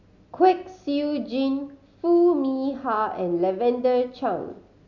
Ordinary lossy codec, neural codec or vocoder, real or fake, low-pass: none; none; real; 7.2 kHz